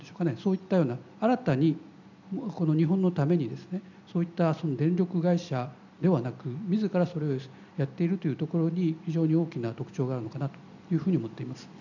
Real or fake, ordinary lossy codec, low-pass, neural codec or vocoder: real; none; 7.2 kHz; none